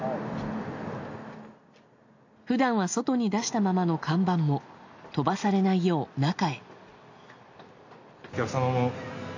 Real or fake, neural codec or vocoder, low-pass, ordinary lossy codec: real; none; 7.2 kHz; AAC, 48 kbps